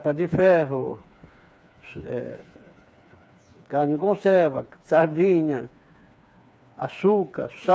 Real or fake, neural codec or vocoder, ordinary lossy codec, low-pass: fake; codec, 16 kHz, 4 kbps, FreqCodec, smaller model; none; none